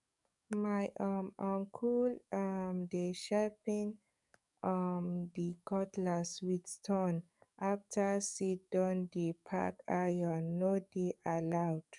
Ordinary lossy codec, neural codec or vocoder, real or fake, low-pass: none; codec, 44.1 kHz, 7.8 kbps, DAC; fake; 10.8 kHz